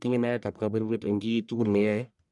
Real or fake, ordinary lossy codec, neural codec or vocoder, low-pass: fake; MP3, 96 kbps; codec, 44.1 kHz, 1.7 kbps, Pupu-Codec; 10.8 kHz